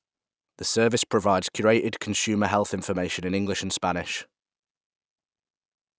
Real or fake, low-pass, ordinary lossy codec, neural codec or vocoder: real; none; none; none